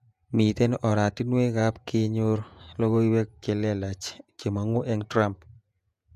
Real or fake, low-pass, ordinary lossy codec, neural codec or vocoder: fake; 14.4 kHz; MP3, 96 kbps; vocoder, 48 kHz, 128 mel bands, Vocos